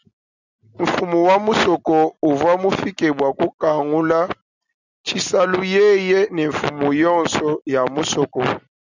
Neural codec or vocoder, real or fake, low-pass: none; real; 7.2 kHz